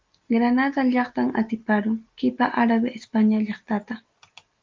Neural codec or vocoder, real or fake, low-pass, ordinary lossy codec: none; real; 7.2 kHz; Opus, 32 kbps